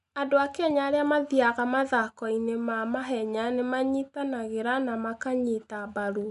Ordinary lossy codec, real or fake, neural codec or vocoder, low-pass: none; real; none; 10.8 kHz